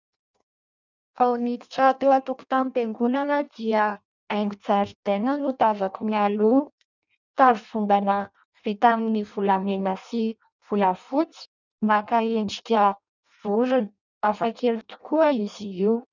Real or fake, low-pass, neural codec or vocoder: fake; 7.2 kHz; codec, 16 kHz in and 24 kHz out, 0.6 kbps, FireRedTTS-2 codec